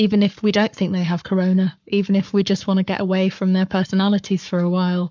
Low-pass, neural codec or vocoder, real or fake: 7.2 kHz; codec, 44.1 kHz, 7.8 kbps, Pupu-Codec; fake